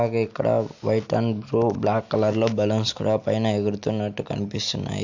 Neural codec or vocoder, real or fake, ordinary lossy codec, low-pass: none; real; none; 7.2 kHz